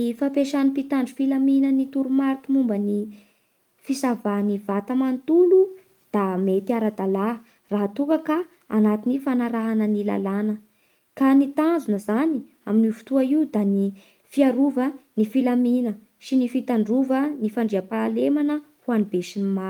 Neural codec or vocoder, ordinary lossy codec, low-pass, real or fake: none; Opus, 32 kbps; 19.8 kHz; real